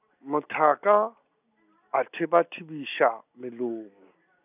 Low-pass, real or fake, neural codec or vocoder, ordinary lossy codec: 3.6 kHz; real; none; none